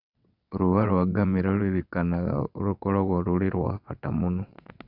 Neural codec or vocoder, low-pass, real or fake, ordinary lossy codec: vocoder, 22.05 kHz, 80 mel bands, WaveNeXt; 5.4 kHz; fake; none